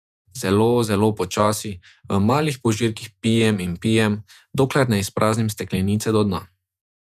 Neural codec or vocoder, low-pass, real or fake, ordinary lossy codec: vocoder, 48 kHz, 128 mel bands, Vocos; 14.4 kHz; fake; none